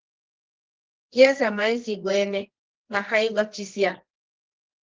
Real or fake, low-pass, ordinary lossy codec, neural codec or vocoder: fake; 7.2 kHz; Opus, 16 kbps; codec, 24 kHz, 0.9 kbps, WavTokenizer, medium music audio release